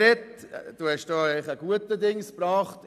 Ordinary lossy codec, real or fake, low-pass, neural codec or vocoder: none; real; 14.4 kHz; none